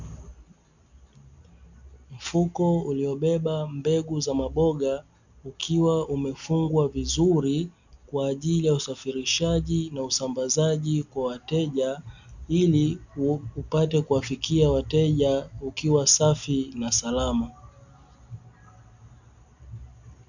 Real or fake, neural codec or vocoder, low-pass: real; none; 7.2 kHz